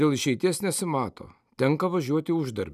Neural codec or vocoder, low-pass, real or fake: none; 14.4 kHz; real